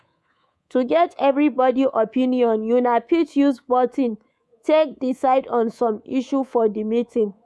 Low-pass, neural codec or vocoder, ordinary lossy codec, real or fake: none; codec, 24 kHz, 3.1 kbps, DualCodec; none; fake